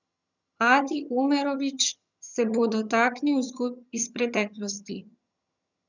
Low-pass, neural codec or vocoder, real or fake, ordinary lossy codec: 7.2 kHz; vocoder, 22.05 kHz, 80 mel bands, HiFi-GAN; fake; none